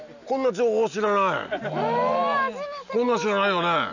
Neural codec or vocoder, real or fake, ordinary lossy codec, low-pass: none; real; none; 7.2 kHz